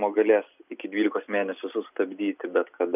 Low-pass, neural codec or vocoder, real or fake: 3.6 kHz; none; real